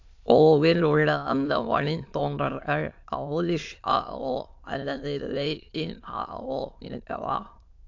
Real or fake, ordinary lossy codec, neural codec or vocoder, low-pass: fake; AAC, 48 kbps; autoencoder, 22.05 kHz, a latent of 192 numbers a frame, VITS, trained on many speakers; 7.2 kHz